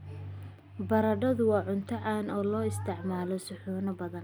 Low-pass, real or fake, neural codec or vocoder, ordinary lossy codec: none; real; none; none